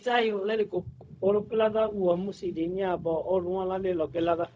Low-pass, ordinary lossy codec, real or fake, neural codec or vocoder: none; none; fake; codec, 16 kHz, 0.4 kbps, LongCat-Audio-Codec